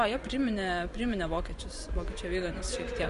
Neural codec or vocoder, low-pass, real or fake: vocoder, 24 kHz, 100 mel bands, Vocos; 10.8 kHz; fake